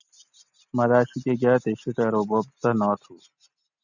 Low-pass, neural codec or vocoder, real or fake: 7.2 kHz; none; real